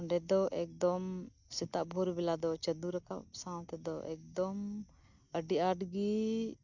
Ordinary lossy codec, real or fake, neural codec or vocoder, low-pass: none; real; none; 7.2 kHz